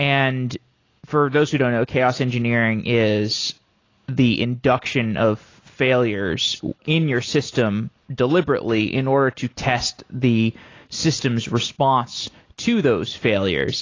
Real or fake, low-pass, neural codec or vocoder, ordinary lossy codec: real; 7.2 kHz; none; AAC, 32 kbps